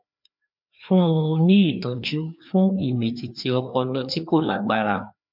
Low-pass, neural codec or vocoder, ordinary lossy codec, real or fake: 5.4 kHz; codec, 16 kHz, 2 kbps, FreqCodec, larger model; MP3, 48 kbps; fake